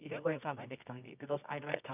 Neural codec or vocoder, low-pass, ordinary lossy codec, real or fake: codec, 24 kHz, 0.9 kbps, WavTokenizer, medium music audio release; 3.6 kHz; none; fake